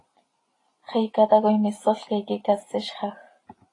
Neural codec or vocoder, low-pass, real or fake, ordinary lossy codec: none; 10.8 kHz; real; AAC, 32 kbps